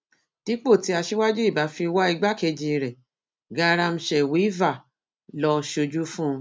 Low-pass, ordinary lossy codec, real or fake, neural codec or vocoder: none; none; real; none